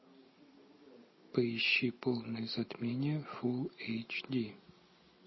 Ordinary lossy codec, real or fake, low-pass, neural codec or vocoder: MP3, 24 kbps; real; 7.2 kHz; none